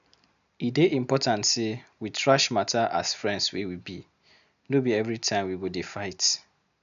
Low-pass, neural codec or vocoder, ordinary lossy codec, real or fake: 7.2 kHz; none; none; real